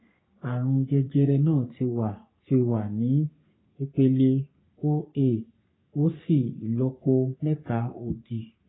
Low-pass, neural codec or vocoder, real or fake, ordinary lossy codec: 7.2 kHz; codec, 44.1 kHz, 3.4 kbps, Pupu-Codec; fake; AAC, 16 kbps